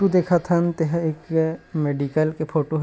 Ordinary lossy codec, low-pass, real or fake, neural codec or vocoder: none; none; real; none